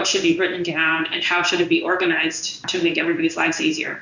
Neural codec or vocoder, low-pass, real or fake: codec, 16 kHz in and 24 kHz out, 1 kbps, XY-Tokenizer; 7.2 kHz; fake